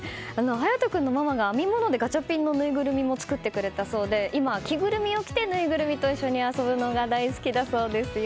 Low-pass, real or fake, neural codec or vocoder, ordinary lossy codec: none; real; none; none